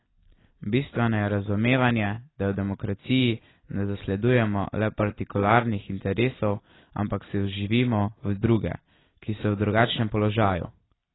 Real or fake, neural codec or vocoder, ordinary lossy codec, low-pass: real; none; AAC, 16 kbps; 7.2 kHz